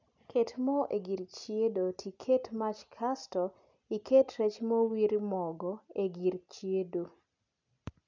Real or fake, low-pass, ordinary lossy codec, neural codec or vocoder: real; 7.2 kHz; none; none